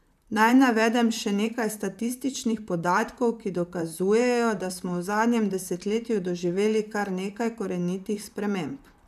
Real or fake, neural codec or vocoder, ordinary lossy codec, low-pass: fake; vocoder, 44.1 kHz, 128 mel bands, Pupu-Vocoder; none; 14.4 kHz